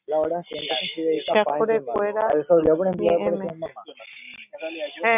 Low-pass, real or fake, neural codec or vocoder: 3.6 kHz; real; none